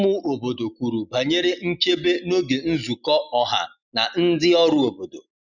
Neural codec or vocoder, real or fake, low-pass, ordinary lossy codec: none; real; 7.2 kHz; none